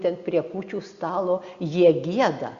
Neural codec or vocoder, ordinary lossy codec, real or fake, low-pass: none; Opus, 64 kbps; real; 7.2 kHz